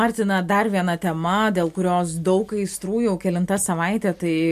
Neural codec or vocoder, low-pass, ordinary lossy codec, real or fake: none; 14.4 kHz; MP3, 64 kbps; real